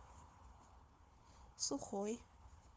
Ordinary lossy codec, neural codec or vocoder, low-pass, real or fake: none; codec, 16 kHz, 4 kbps, FunCodec, trained on Chinese and English, 50 frames a second; none; fake